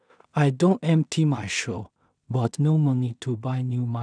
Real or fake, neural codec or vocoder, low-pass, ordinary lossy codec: fake; codec, 16 kHz in and 24 kHz out, 0.4 kbps, LongCat-Audio-Codec, two codebook decoder; 9.9 kHz; none